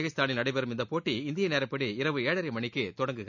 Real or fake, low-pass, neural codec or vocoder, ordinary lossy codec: real; 7.2 kHz; none; none